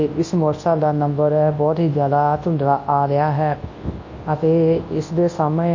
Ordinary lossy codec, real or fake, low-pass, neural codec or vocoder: MP3, 32 kbps; fake; 7.2 kHz; codec, 24 kHz, 0.9 kbps, WavTokenizer, large speech release